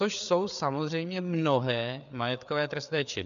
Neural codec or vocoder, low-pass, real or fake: codec, 16 kHz, 4 kbps, FreqCodec, larger model; 7.2 kHz; fake